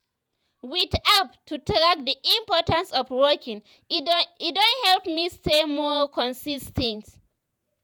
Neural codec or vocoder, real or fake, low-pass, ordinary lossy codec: vocoder, 48 kHz, 128 mel bands, Vocos; fake; none; none